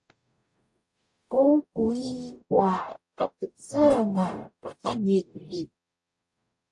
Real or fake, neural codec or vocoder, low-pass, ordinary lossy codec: fake; codec, 44.1 kHz, 0.9 kbps, DAC; 10.8 kHz; AAC, 64 kbps